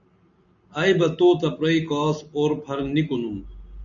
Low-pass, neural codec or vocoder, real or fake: 7.2 kHz; none; real